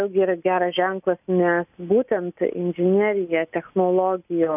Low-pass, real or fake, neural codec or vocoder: 3.6 kHz; real; none